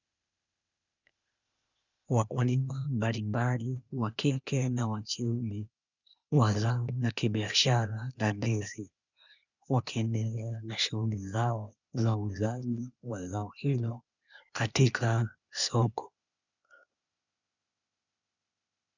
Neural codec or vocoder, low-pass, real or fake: codec, 16 kHz, 0.8 kbps, ZipCodec; 7.2 kHz; fake